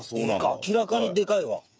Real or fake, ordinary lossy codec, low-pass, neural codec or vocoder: fake; none; none; codec, 16 kHz, 8 kbps, FreqCodec, smaller model